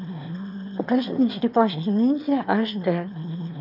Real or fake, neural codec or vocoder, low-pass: fake; autoencoder, 22.05 kHz, a latent of 192 numbers a frame, VITS, trained on one speaker; 5.4 kHz